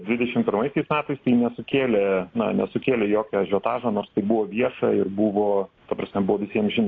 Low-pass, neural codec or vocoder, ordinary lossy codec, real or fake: 7.2 kHz; none; AAC, 32 kbps; real